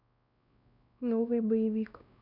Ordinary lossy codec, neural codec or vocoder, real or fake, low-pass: none; codec, 16 kHz, 1 kbps, X-Codec, WavLM features, trained on Multilingual LibriSpeech; fake; 5.4 kHz